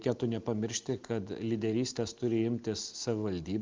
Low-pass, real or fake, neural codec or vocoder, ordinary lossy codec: 7.2 kHz; real; none; Opus, 32 kbps